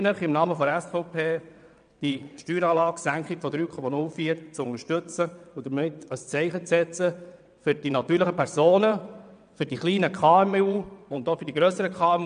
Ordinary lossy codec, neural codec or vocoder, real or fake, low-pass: none; vocoder, 22.05 kHz, 80 mel bands, WaveNeXt; fake; 9.9 kHz